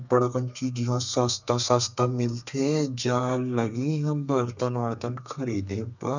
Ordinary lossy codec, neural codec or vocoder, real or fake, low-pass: none; codec, 32 kHz, 1.9 kbps, SNAC; fake; 7.2 kHz